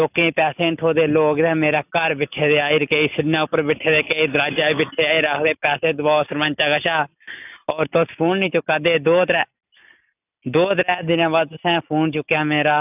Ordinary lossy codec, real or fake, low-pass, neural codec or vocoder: none; real; 3.6 kHz; none